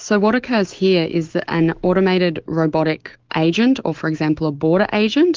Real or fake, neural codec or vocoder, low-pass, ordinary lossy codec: fake; autoencoder, 48 kHz, 128 numbers a frame, DAC-VAE, trained on Japanese speech; 7.2 kHz; Opus, 16 kbps